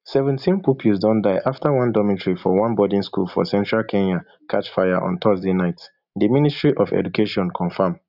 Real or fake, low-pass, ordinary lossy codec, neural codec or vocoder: real; 5.4 kHz; none; none